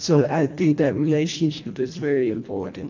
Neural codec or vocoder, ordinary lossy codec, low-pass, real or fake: codec, 24 kHz, 1.5 kbps, HILCodec; AAC, 48 kbps; 7.2 kHz; fake